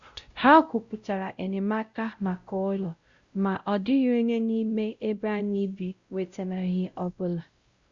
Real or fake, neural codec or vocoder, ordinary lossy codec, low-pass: fake; codec, 16 kHz, 0.5 kbps, X-Codec, WavLM features, trained on Multilingual LibriSpeech; Opus, 64 kbps; 7.2 kHz